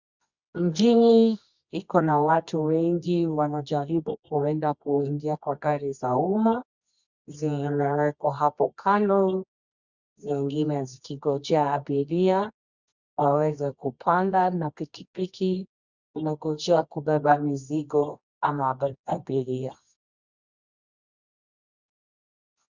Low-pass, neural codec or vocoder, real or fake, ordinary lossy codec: 7.2 kHz; codec, 24 kHz, 0.9 kbps, WavTokenizer, medium music audio release; fake; Opus, 64 kbps